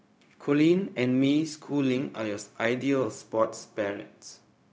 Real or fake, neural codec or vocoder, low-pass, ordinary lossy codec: fake; codec, 16 kHz, 0.4 kbps, LongCat-Audio-Codec; none; none